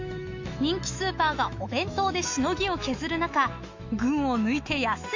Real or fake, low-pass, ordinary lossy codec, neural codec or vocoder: fake; 7.2 kHz; AAC, 48 kbps; autoencoder, 48 kHz, 128 numbers a frame, DAC-VAE, trained on Japanese speech